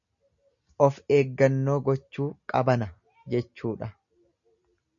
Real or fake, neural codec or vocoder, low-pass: real; none; 7.2 kHz